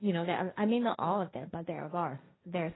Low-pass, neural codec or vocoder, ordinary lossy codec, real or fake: 7.2 kHz; codec, 16 kHz, 1.1 kbps, Voila-Tokenizer; AAC, 16 kbps; fake